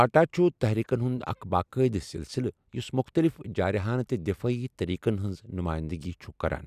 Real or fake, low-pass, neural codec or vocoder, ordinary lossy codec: real; 14.4 kHz; none; none